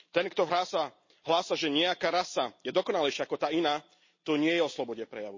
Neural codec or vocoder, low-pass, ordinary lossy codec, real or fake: none; 7.2 kHz; MP3, 32 kbps; real